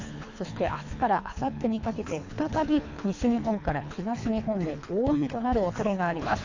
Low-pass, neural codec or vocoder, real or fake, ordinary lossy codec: 7.2 kHz; codec, 24 kHz, 3 kbps, HILCodec; fake; AAC, 48 kbps